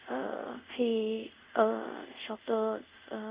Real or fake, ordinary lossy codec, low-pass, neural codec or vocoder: fake; Opus, 64 kbps; 3.6 kHz; codec, 24 kHz, 0.5 kbps, DualCodec